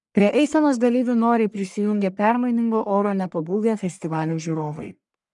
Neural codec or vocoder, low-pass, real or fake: codec, 44.1 kHz, 1.7 kbps, Pupu-Codec; 10.8 kHz; fake